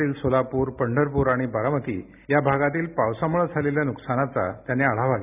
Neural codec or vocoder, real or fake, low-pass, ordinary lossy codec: none; real; 3.6 kHz; none